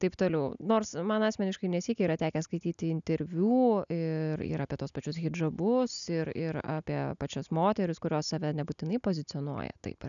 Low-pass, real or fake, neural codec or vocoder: 7.2 kHz; real; none